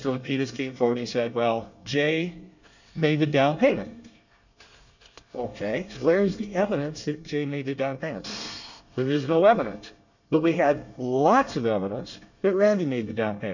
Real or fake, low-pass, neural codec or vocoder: fake; 7.2 kHz; codec, 24 kHz, 1 kbps, SNAC